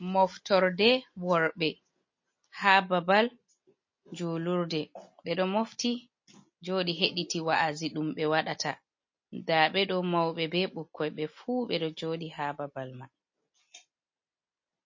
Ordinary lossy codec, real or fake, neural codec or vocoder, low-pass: MP3, 32 kbps; real; none; 7.2 kHz